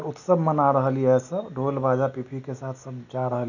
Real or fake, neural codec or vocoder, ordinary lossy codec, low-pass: real; none; none; 7.2 kHz